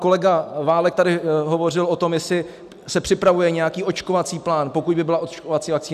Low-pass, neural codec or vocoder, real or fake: 14.4 kHz; none; real